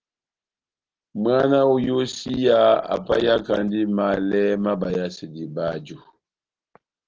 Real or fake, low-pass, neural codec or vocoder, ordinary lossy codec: real; 7.2 kHz; none; Opus, 16 kbps